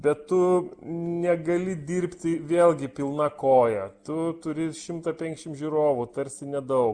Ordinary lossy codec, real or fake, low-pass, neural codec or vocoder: Opus, 32 kbps; real; 9.9 kHz; none